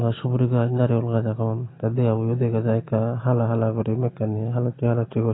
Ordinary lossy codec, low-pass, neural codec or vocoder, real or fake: AAC, 16 kbps; 7.2 kHz; none; real